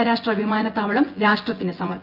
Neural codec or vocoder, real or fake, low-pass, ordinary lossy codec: vocoder, 24 kHz, 100 mel bands, Vocos; fake; 5.4 kHz; Opus, 24 kbps